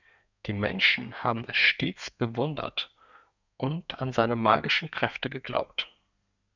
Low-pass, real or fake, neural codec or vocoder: 7.2 kHz; fake; codec, 32 kHz, 1.9 kbps, SNAC